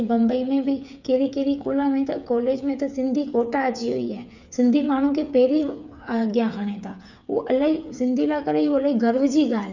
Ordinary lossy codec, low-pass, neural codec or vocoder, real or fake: none; 7.2 kHz; codec, 16 kHz, 8 kbps, FreqCodec, smaller model; fake